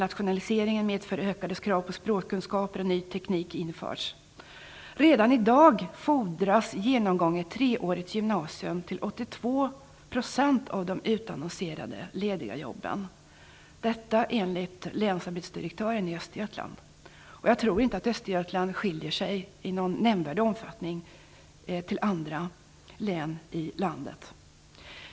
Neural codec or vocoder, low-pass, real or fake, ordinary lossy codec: none; none; real; none